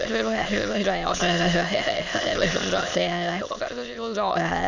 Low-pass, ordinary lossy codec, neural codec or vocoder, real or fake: 7.2 kHz; none; autoencoder, 22.05 kHz, a latent of 192 numbers a frame, VITS, trained on many speakers; fake